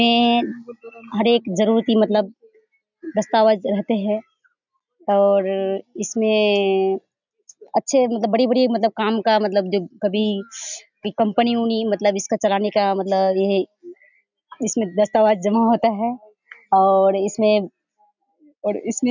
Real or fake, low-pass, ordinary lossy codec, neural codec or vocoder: real; 7.2 kHz; none; none